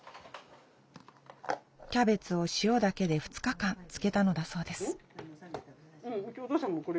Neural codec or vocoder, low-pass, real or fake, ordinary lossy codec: none; none; real; none